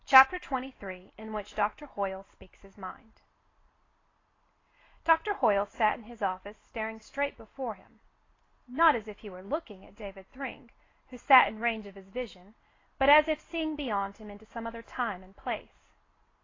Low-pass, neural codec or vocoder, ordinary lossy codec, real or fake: 7.2 kHz; none; AAC, 32 kbps; real